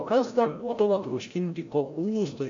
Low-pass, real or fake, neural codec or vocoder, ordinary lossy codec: 7.2 kHz; fake; codec, 16 kHz, 0.5 kbps, FreqCodec, larger model; AAC, 48 kbps